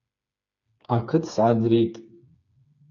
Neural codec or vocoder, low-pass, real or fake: codec, 16 kHz, 4 kbps, FreqCodec, smaller model; 7.2 kHz; fake